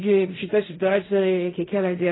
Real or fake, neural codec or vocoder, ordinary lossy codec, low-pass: fake; codec, 16 kHz in and 24 kHz out, 0.4 kbps, LongCat-Audio-Codec, fine tuned four codebook decoder; AAC, 16 kbps; 7.2 kHz